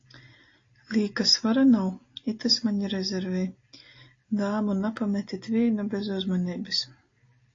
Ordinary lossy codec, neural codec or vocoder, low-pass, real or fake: AAC, 32 kbps; none; 7.2 kHz; real